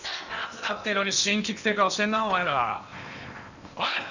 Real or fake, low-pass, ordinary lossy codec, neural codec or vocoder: fake; 7.2 kHz; none; codec, 16 kHz in and 24 kHz out, 0.8 kbps, FocalCodec, streaming, 65536 codes